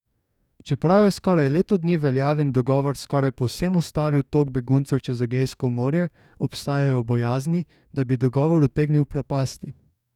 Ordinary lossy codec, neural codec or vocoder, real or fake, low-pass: none; codec, 44.1 kHz, 2.6 kbps, DAC; fake; 19.8 kHz